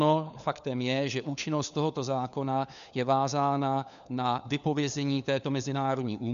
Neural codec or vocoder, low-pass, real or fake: codec, 16 kHz, 4 kbps, FunCodec, trained on LibriTTS, 50 frames a second; 7.2 kHz; fake